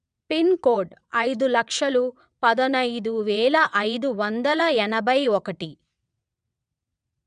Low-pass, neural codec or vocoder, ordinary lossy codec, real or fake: 9.9 kHz; vocoder, 22.05 kHz, 80 mel bands, WaveNeXt; none; fake